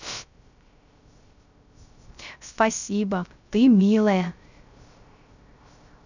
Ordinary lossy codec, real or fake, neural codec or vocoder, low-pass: none; fake; codec, 16 kHz, 0.3 kbps, FocalCodec; 7.2 kHz